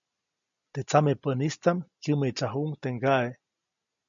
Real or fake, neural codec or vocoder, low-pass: real; none; 7.2 kHz